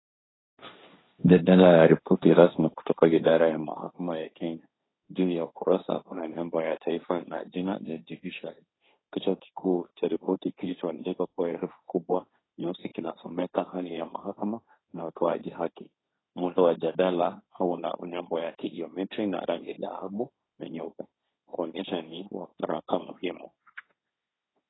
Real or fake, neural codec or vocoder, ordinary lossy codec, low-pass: fake; codec, 16 kHz, 1.1 kbps, Voila-Tokenizer; AAC, 16 kbps; 7.2 kHz